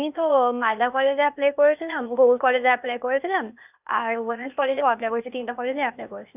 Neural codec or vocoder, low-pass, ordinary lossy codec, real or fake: codec, 16 kHz, 0.8 kbps, ZipCodec; 3.6 kHz; none; fake